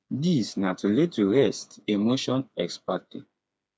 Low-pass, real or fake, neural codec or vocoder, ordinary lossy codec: none; fake; codec, 16 kHz, 4 kbps, FreqCodec, smaller model; none